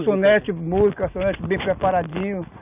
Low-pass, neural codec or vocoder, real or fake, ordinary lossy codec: 3.6 kHz; none; real; none